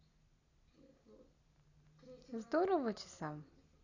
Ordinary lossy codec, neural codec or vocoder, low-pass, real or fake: none; none; 7.2 kHz; real